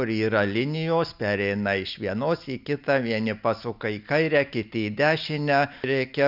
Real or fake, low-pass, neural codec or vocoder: real; 5.4 kHz; none